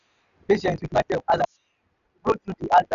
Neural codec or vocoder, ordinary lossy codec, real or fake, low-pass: none; AAC, 96 kbps; real; 7.2 kHz